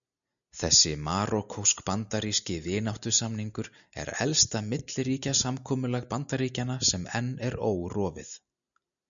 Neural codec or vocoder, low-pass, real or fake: none; 7.2 kHz; real